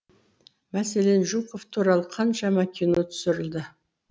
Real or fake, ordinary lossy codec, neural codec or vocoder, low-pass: real; none; none; none